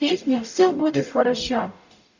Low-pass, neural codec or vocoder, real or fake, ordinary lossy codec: 7.2 kHz; codec, 44.1 kHz, 0.9 kbps, DAC; fake; none